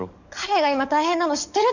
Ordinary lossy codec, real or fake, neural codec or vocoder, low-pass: none; fake; codec, 44.1 kHz, 7.8 kbps, DAC; 7.2 kHz